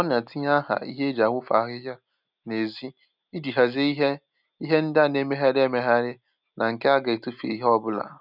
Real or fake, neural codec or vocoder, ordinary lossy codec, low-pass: real; none; none; 5.4 kHz